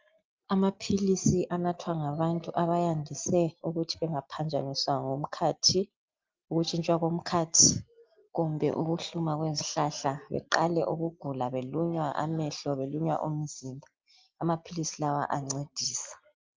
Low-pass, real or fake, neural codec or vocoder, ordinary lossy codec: 7.2 kHz; real; none; Opus, 32 kbps